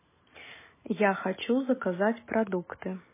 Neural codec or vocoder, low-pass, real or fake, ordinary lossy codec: none; 3.6 kHz; real; MP3, 16 kbps